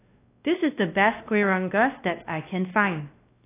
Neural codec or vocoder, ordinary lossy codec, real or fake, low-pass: codec, 16 kHz, 1 kbps, X-Codec, WavLM features, trained on Multilingual LibriSpeech; AAC, 24 kbps; fake; 3.6 kHz